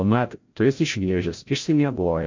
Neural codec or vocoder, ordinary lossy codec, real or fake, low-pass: codec, 16 kHz, 0.5 kbps, FreqCodec, larger model; MP3, 48 kbps; fake; 7.2 kHz